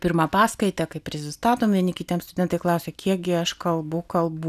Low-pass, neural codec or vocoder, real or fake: 14.4 kHz; none; real